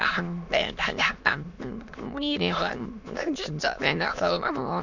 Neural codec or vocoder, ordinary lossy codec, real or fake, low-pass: autoencoder, 22.05 kHz, a latent of 192 numbers a frame, VITS, trained on many speakers; none; fake; 7.2 kHz